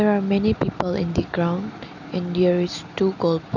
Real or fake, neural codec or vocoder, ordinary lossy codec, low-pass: real; none; none; 7.2 kHz